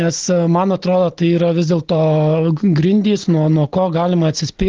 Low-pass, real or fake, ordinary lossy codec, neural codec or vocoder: 7.2 kHz; real; Opus, 16 kbps; none